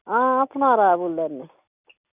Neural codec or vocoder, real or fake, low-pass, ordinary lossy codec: none; real; 3.6 kHz; none